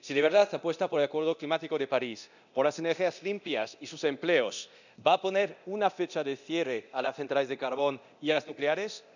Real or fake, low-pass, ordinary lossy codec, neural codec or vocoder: fake; 7.2 kHz; none; codec, 24 kHz, 0.9 kbps, DualCodec